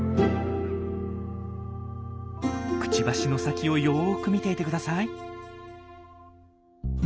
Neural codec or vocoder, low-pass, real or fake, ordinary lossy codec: none; none; real; none